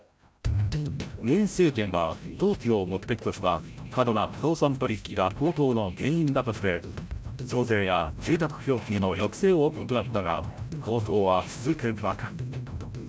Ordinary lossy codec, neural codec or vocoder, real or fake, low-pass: none; codec, 16 kHz, 0.5 kbps, FreqCodec, larger model; fake; none